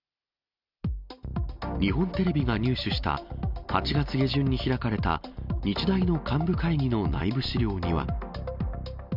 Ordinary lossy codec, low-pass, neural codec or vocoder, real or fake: none; 5.4 kHz; none; real